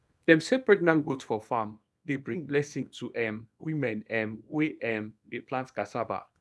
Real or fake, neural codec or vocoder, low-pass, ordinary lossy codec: fake; codec, 24 kHz, 0.9 kbps, WavTokenizer, small release; none; none